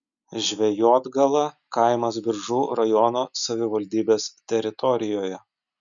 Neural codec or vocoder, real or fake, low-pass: none; real; 7.2 kHz